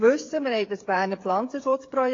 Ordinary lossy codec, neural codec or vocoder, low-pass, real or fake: AAC, 32 kbps; codec, 16 kHz, 8 kbps, FreqCodec, smaller model; 7.2 kHz; fake